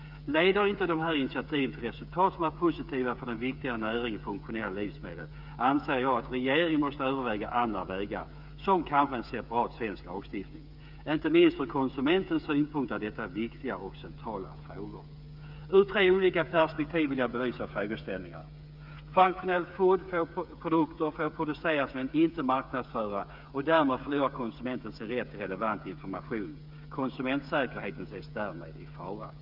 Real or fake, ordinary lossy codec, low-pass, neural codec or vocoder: fake; none; 5.4 kHz; codec, 16 kHz, 8 kbps, FreqCodec, smaller model